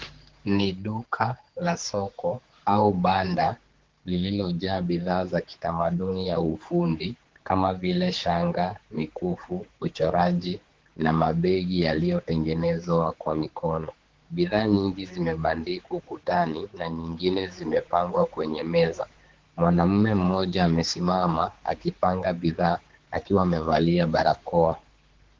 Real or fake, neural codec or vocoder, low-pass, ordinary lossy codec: fake; codec, 16 kHz, 4 kbps, X-Codec, HuBERT features, trained on general audio; 7.2 kHz; Opus, 16 kbps